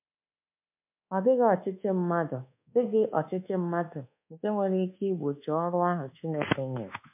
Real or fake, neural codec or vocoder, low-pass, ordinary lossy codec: fake; codec, 24 kHz, 1.2 kbps, DualCodec; 3.6 kHz; MP3, 32 kbps